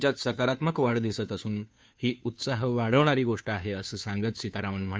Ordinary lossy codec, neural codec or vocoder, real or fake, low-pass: none; codec, 16 kHz, 2 kbps, FunCodec, trained on Chinese and English, 25 frames a second; fake; none